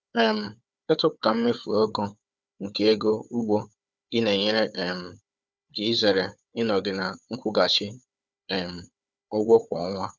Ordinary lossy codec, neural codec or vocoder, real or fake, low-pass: none; codec, 16 kHz, 4 kbps, FunCodec, trained on Chinese and English, 50 frames a second; fake; none